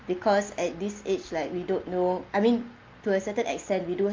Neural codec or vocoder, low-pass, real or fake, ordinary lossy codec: none; 7.2 kHz; real; Opus, 32 kbps